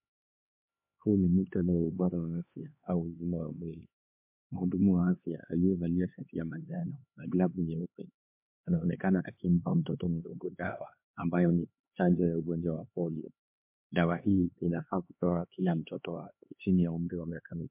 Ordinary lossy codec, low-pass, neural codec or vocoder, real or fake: MP3, 32 kbps; 3.6 kHz; codec, 16 kHz, 2 kbps, X-Codec, HuBERT features, trained on LibriSpeech; fake